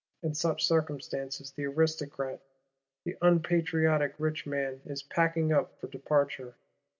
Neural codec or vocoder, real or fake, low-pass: none; real; 7.2 kHz